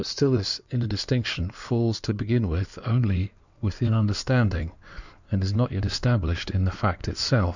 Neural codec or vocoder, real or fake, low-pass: codec, 16 kHz in and 24 kHz out, 2.2 kbps, FireRedTTS-2 codec; fake; 7.2 kHz